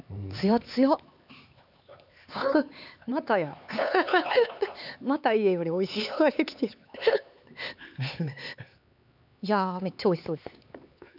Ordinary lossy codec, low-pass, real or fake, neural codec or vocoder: none; 5.4 kHz; fake; codec, 16 kHz, 4 kbps, X-Codec, HuBERT features, trained on LibriSpeech